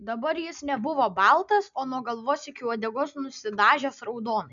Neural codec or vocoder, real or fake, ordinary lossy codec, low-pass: none; real; MP3, 96 kbps; 7.2 kHz